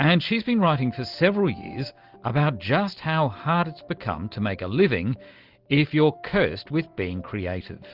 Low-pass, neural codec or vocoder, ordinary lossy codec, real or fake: 5.4 kHz; none; Opus, 32 kbps; real